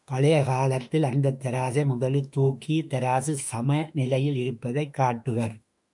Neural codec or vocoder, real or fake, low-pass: autoencoder, 48 kHz, 32 numbers a frame, DAC-VAE, trained on Japanese speech; fake; 10.8 kHz